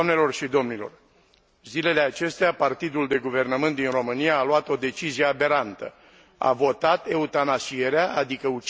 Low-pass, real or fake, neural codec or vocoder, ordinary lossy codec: none; real; none; none